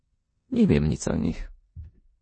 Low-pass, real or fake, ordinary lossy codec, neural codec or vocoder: 9.9 kHz; fake; MP3, 32 kbps; codec, 16 kHz in and 24 kHz out, 0.9 kbps, LongCat-Audio-Codec, four codebook decoder